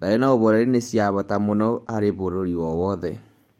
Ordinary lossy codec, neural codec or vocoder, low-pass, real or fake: MP3, 64 kbps; codec, 44.1 kHz, 7.8 kbps, DAC; 19.8 kHz; fake